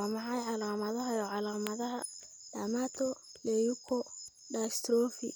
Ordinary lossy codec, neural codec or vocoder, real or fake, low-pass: none; none; real; none